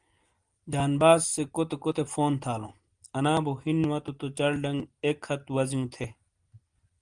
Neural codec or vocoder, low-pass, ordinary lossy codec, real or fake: none; 9.9 kHz; Opus, 24 kbps; real